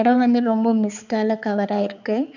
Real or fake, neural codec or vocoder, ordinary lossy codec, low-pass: fake; codec, 16 kHz, 4 kbps, X-Codec, HuBERT features, trained on balanced general audio; none; 7.2 kHz